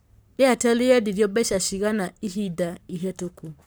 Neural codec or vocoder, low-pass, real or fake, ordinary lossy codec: codec, 44.1 kHz, 7.8 kbps, Pupu-Codec; none; fake; none